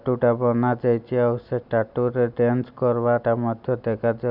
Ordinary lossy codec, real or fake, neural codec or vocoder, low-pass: none; real; none; 5.4 kHz